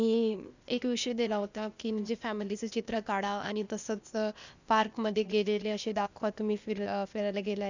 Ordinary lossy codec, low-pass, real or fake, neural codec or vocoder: none; 7.2 kHz; fake; codec, 16 kHz, 0.8 kbps, ZipCodec